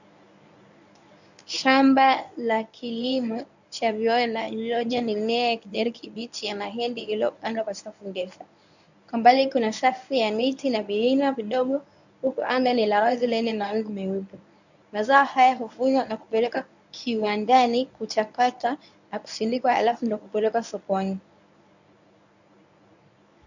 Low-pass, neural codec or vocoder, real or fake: 7.2 kHz; codec, 24 kHz, 0.9 kbps, WavTokenizer, medium speech release version 1; fake